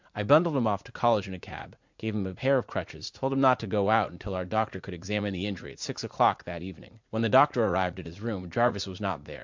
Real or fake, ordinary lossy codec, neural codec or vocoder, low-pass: fake; MP3, 64 kbps; vocoder, 44.1 kHz, 80 mel bands, Vocos; 7.2 kHz